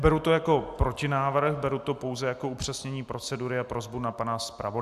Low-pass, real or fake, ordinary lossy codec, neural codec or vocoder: 14.4 kHz; real; AAC, 96 kbps; none